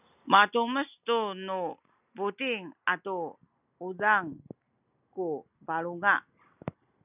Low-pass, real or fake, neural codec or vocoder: 3.6 kHz; real; none